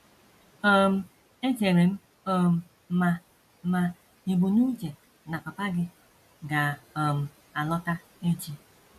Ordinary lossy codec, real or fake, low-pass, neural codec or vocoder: none; real; 14.4 kHz; none